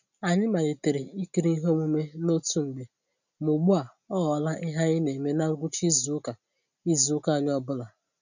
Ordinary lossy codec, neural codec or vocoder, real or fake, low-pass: none; none; real; 7.2 kHz